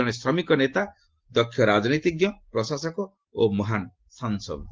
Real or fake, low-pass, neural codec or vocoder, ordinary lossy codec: real; 7.2 kHz; none; Opus, 16 kbps